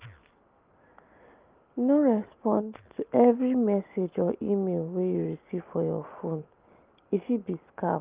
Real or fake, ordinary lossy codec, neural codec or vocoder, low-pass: real; Opus, 24 kbps; none; 3.6 kHz